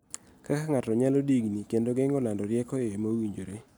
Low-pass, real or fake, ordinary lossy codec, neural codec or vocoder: none; real; none; none